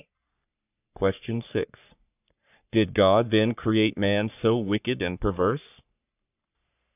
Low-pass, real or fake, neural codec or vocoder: 3.6 kHz; fake; codec, 44.1 kHz, 3.4 kbps, Pupu-Codec